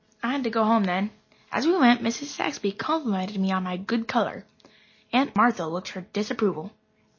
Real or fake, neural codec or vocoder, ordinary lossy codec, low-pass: real; none; MP3, 32 kbps; 7.2 kHz